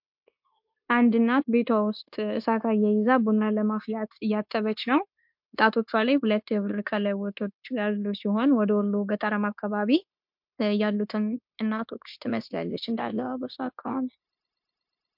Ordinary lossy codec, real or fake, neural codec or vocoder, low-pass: MP3, 48 kbps; fake; codec, 16 kHz, 0.9 kbps, LongCat-Audio-Codec; 5.4 kHz